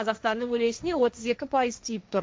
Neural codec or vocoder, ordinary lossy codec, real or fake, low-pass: codec, 16 kHz, 1.1 kbps, Voila-Tokenizer; none; fake; none